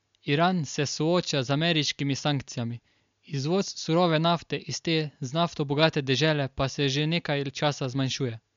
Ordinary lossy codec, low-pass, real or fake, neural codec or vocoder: MP3, 64 kbps; 7.2 kHz; real; none